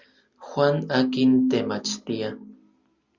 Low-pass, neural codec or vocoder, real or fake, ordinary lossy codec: 7.2 kHz; none; real; Opus, 64 kbps